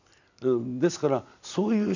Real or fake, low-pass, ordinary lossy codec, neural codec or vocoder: real; 7.2 kHz; none; none